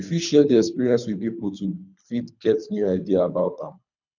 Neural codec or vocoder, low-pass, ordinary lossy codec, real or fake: codec, 24 kHz, 3 kbps, HILCodec; 7.2 kHz; none; fake